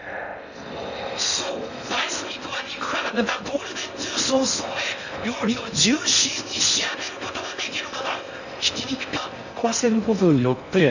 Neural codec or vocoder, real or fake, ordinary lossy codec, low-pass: codec, 16 kHz in and 24 kHz out, 0.6 kbps, FocalCodec, streaming, 4096 codes; fake; none; 7.2 kHz